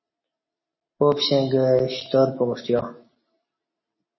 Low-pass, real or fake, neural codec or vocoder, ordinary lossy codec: 7.2 kHz; real; none; MP3, 24 kbps